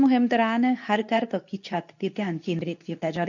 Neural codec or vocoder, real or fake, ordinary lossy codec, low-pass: codec, 24 kHz, 0.9 kbps, WavTokenizer, medium speech release version 2; fake; none; 7.2 kHz